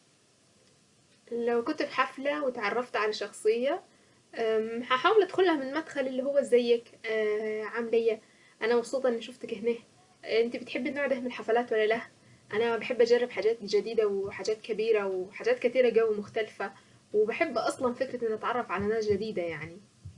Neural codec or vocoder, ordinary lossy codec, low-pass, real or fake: none; Opus, 64 kbps; 10.8 kHz; real